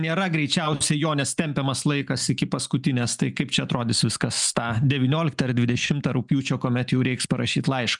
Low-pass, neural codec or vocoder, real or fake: 10.8 kHz; none; real